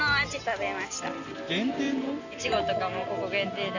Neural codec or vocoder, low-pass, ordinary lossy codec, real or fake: none; 7.2 kHz; none; real